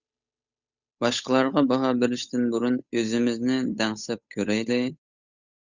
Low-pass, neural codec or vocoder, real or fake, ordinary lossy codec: 7.2 kHz; codec, 16 kHz, 8 kbps, FunCodec, trained on Chinese and English, 25 frames a second; fake; Opus, 64 kbps